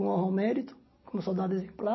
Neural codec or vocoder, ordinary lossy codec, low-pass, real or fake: none; MP3, 24 kbps; 7.2 kHz; real